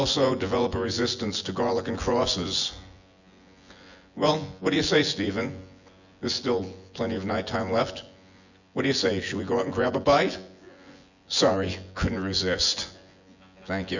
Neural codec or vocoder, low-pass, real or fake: vocoder, 24 kHz, 100 mel bands, Vocos; 7.2 kHz; fake